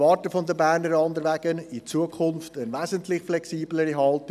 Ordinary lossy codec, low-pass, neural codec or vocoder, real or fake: none; 14.4 kHz; none; real